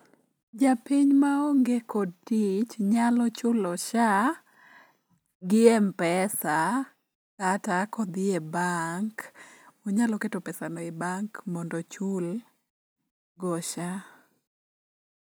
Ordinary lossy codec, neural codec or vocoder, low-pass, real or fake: none; none; none; real